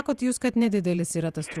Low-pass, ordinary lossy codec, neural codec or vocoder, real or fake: 14.4 kHz; Opus, 64 kbps; vocoder, 48 kHz, 128 mel bands, Vocos; fake